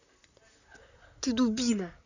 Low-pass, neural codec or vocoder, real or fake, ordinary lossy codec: 7.2 kHz; vocoder, 44.1 kHz, 128 mel bands, Pupu-Vocoder; fake; none